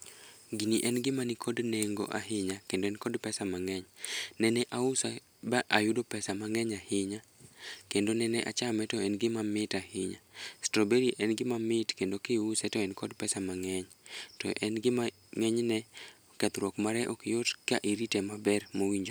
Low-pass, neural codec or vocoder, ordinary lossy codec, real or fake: none; none; none; real